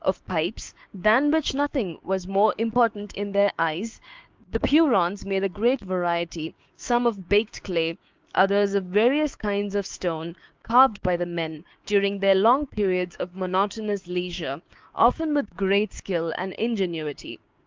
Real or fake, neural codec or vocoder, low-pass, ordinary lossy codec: fake; codec, 16 kHz, 6 kbps, DAC; 7.2 kHz; Opus, 32 kbps